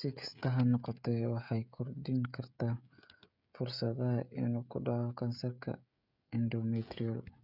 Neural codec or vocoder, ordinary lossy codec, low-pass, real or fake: codec, 16 kHz, 16 kbps, FreqCodec, smaller model; none; 5.4 kHz; fake